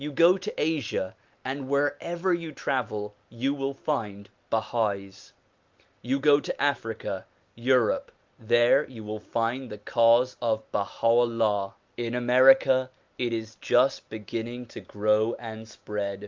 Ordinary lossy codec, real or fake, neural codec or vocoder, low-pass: Opus, 24 kbps; real; none; 7.2 kHz